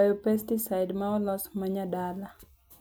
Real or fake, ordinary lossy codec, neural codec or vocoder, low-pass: real; none; none; none